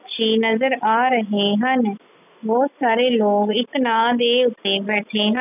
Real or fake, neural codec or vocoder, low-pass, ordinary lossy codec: real; none; 3.6 kHz; none